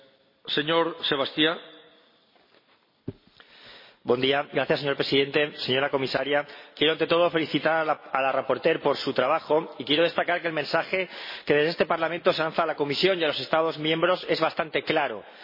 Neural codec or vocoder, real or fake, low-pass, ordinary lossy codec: none; real; 5.4 kHz; MP3, 24 kbps